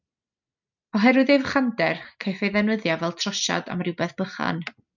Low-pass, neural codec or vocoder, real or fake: 7.2 kHz; none; real